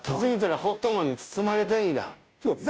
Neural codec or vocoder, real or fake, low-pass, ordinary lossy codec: codec, 16 kHz, 0.5 kbps, FunCodec, trained on Chinese and English, 25 frames a second; fake; none; none